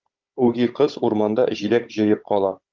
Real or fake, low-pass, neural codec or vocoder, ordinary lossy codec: fake; 7.2 kHz; codec, 16 kHz, 16 kbps, FunCodec, trained on Chinese and English, 50 frames a second; Opus, 24 kbps